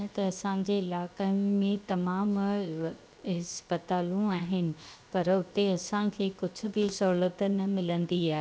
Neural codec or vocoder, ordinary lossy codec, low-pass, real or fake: codec, 16 kHz, 0.7 kbps, FocalCodec; none; none; fake